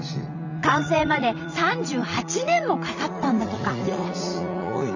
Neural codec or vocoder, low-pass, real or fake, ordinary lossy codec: vocoder, 44.1 kHz, 80 mel bands, Vocos; 7.2 kHz; fake; none